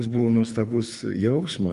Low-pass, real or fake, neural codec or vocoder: 10.8 kHz; fake; codec, 24 kHz, 3 kbps, HILCodec